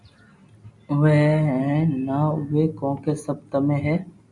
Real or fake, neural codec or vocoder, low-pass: real; none; 10.8 kHz